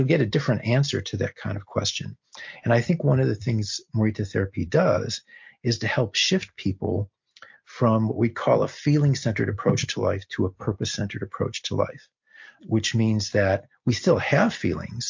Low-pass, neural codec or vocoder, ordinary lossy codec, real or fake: 7.2 kHz; none; MP3, 48 kbps; real